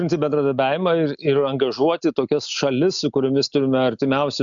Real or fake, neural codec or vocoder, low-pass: real; none; 7.2 kHz